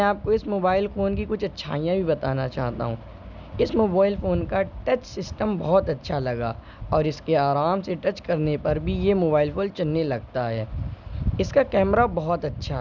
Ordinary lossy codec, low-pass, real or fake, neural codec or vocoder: none; 7.2 kHz; real; none